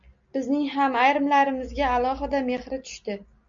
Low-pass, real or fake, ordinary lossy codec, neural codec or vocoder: 7.2 kHz; real; MP3, 64 kbps; none